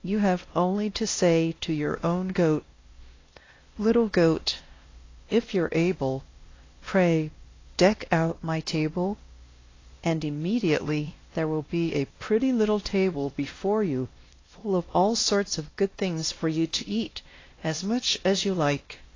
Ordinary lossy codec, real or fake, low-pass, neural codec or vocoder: AAC, 32 kbps; fake; 7.2 kHz; codec, 16 kHz, 1 kbps, X-Codec, WavLM features, trained on Multilingual LibriSpeech